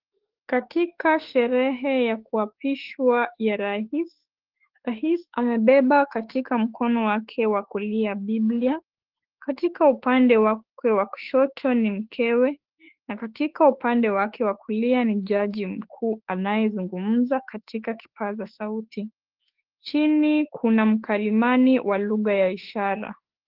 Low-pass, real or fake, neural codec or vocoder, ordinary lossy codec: 5.4 kHz; fake; autoencoder, 48 kHz, 32 numbers a frame, DAC-VAE, trained on Japanese speech; Opus, 16 kbps